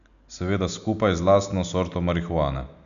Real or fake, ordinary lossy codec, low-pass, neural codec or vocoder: real; none; 7.2 kHz; none